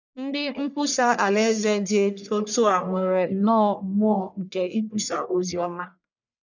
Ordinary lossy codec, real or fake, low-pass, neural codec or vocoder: none; fake; 7.2 kHz; codec, 44.1 kHz, 1.7 kbps, Pupu-Codec